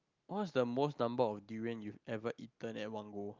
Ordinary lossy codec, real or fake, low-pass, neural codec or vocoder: Opus, 24 kbps; real; 7.2 kHz; none